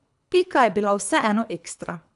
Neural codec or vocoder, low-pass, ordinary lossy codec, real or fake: codec, 24 kHz, 3 kbps, HILCodec; 10.8 kHz; MP3, 96 kbps; fake